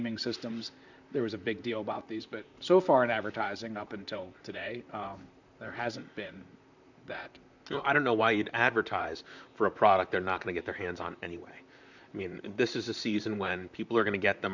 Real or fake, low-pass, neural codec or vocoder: fake; 7.2 kHz; vocoder, 44.1 kHz, 128 mel bands, Pupu-Vocoder